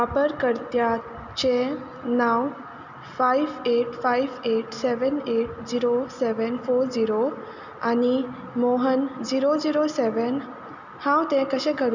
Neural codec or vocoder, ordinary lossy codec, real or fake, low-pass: none; none; real; 7.2 kHz